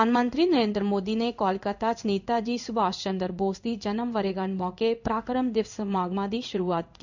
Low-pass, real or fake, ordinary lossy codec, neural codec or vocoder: 7.2 kHz; fake; none; codec, 16 kHz in and 24 kHz out, 1 kbps, XY-Tokenizer